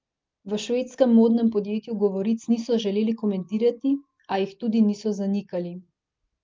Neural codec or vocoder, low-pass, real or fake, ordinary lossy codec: none; 7.2 kHz; real; Opus, 24 kbps